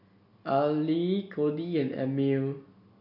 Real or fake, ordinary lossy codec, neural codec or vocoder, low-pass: real; none; none; 5.4 kHz